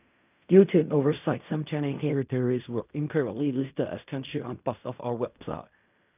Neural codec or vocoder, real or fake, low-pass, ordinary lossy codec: codec, 16 kHz in and 24 kHz out, 0.4 kbps, LongCat-Audio-Codec, fine tuned four codebook decoder; fake; 3.6 kHz; none